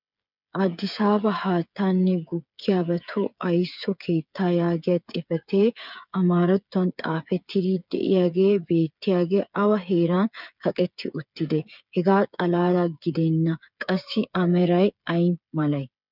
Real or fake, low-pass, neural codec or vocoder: fake; 5.4 kHz; codec, 16 kHz, 8 kbps, FreqCodec, smaller model